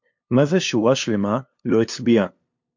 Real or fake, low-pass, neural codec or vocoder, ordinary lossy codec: fake; 7.2 kHz; codec, 16 kHz, 2 kbps, FunCodec, trained on LibriTTS, 25 frames a second; MP3, 48 kbps